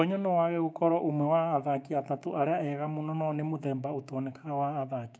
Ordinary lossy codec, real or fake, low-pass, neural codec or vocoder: none; fake; none; codec, 16 kHz, 6 kbps, DAC